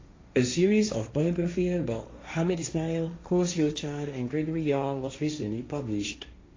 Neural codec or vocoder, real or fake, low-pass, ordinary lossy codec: codec, 16 kHz, 1.1 kbps, Voila-Tokenizer; fake; 7.2 kHz; AAC, 32 kbps